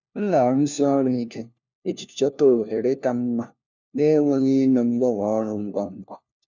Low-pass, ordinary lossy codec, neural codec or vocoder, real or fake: 7.2 kHz; none; codec, 16 kHz, 1 kbps, FunCodec, trained on LibriTTS, 50 frames a second; fake